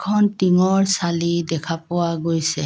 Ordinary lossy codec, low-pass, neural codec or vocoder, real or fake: none; none; none; real